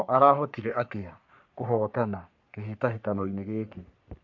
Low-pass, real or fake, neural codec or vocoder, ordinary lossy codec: 7.2 kHz; fake; codec, 44.1 kHz, 3.4 kbps, Pupu-Codec; MP3, 48 kbps